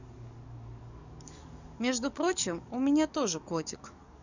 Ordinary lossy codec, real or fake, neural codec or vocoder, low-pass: none; fake; codec, 44.1 kHz, 7.8 kbps, DAC; 7.2 kHz